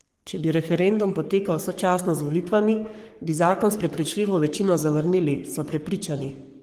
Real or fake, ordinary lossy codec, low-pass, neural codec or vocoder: fake; Opus, 24 kbps; 14.4 kHz; codec, 44.1 kHz, 3.4 kbps, Pupu-Codec